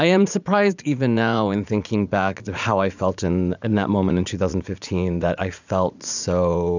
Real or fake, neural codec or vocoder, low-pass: fake; vocoder, 44.1 kHz, 80 mel bands, Vocos; 7.2 kHz